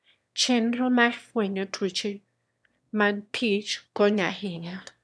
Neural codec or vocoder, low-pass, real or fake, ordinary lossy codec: autoencoder, 22.05 kHz, a latent of 192 numbers a frame, VITS, trained on one speaker; none; fake; none